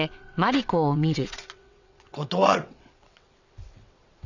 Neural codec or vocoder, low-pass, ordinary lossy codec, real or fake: vocoder, 22.05 kHz, 80 mel bands, WaveNeXt; 7.2 kHz; none; fake